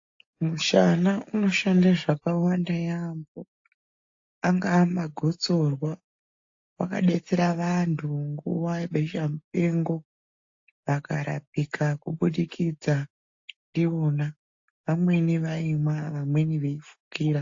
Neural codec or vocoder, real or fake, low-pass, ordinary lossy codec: none; real; 7.2 kHz; AAC, 48 kbps